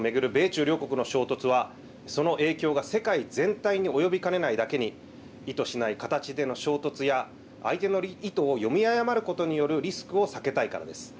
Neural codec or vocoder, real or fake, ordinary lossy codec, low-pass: none; real; none; none